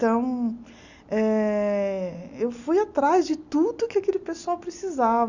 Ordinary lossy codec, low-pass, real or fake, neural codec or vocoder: none; 7.2 kHz; real; none